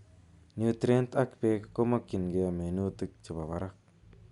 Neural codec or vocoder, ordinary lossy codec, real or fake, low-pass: none; Opus, 64 kbps; real; 10.8 kHz